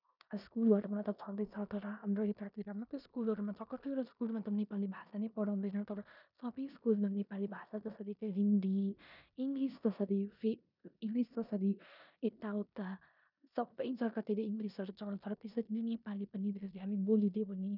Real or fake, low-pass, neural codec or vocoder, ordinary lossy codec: fake; 5.4 kHz; codec, 16 kHz in and 24 kHz out, 0.9 kbps, LongCat-Audio-Codec, four codebook decoder; none